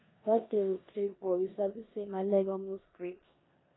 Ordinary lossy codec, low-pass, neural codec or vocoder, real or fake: AAC, 16 kbps; 7.2 kHz; codec, 16 kHz in and 24 kHz out, 0.9 kbps, LongCat-Audio-Codec, four codebook decoder; fake